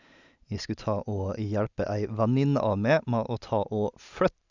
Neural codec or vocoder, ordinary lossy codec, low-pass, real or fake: none; none; 7.2 kHz; real